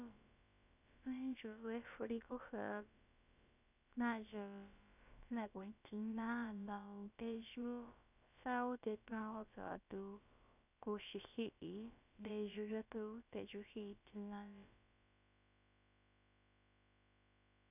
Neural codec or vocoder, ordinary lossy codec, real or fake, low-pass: codec, 16 kHz, about 1 kbps, DyCAST, with the encoder's durations; none; fake; 3.6 kHz